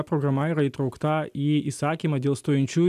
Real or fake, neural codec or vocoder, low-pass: real; none; 14.4 kHz